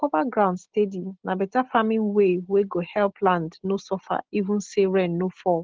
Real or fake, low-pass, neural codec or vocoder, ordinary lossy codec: real; 7.2 kHz; none; Opus, 16 kbps